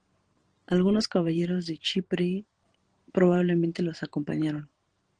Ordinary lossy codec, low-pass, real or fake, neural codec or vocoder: Opus, 16 kbps; 9.9 kHz; fake; vocoder, 44.1 kHz, 128 mel bands every 512 samples, BigVGAN v2